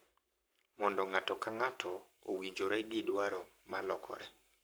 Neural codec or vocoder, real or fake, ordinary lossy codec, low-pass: codec, 44.1 kHz, 7.8 kbps, Pupu-Codec; fake; none; none